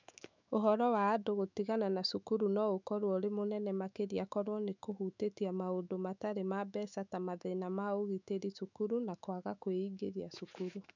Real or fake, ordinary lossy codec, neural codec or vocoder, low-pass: fake; none; autoencoder, 48 kHz, 128 numbers a frame, DAC-VAE, trained on Japanese speech; 7.2 kHz